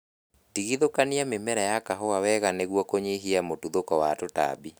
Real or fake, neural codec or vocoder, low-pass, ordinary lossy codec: real; none; none; none